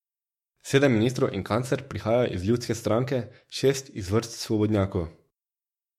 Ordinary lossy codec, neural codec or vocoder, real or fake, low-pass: MP3, 64 kbps; autoencoder, 48 kHz, 128 numbers a frame, DAC-VAE, trained on Japanese speech; fake; 19.8 kHz